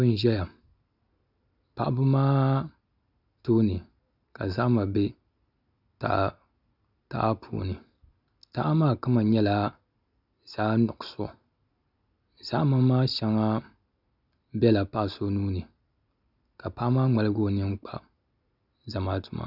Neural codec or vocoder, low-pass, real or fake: none; 5.4 kHz; real